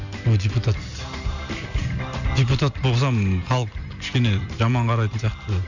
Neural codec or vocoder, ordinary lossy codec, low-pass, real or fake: none; none; 7.2 kHz; real